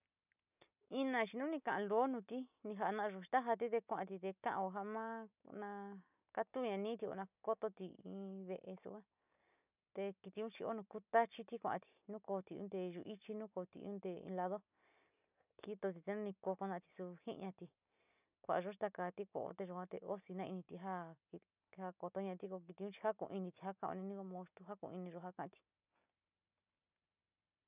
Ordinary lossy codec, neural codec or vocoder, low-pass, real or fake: none; none; 3.6 kHz; real